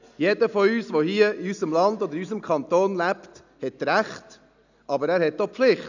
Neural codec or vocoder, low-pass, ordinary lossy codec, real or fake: none; 7.2 kHz; none; real